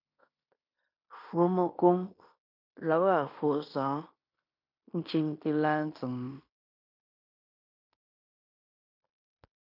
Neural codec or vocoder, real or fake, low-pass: codec, 16 kHz in and 24 kHz out, 0.9 kbps, LongCat-Audio-Codec, fine tuned four codebook decoder; fake; 5.4 kHz